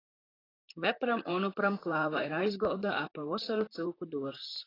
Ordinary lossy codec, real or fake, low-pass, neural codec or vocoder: AAC, 24 kbps; fake; 5.4 kHz; vocoder, 44.1 kHz, 128 mel bands, Pupu-Vocoder